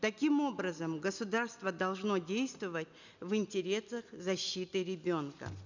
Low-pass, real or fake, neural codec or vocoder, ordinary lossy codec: 7.2 kHz; real; none; none